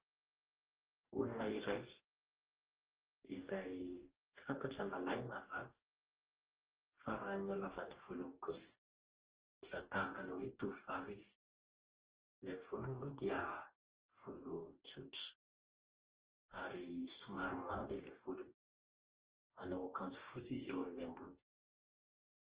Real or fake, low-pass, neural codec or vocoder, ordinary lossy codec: fake; 3.6 kHz; codec, 44.1 kHz, 2.6 kbps, DAC; Opus, 16 kbps